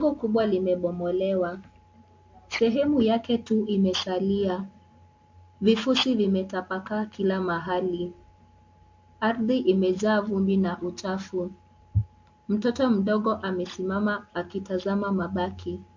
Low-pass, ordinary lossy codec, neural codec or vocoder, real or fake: 7.2 kHz; MP3, 48 kbps; none; real